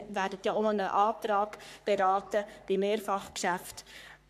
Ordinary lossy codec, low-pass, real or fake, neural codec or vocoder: none; 14.4 kHz; fake; codec, 44.1 kHz, 3.4 kbps, Pupu-Codec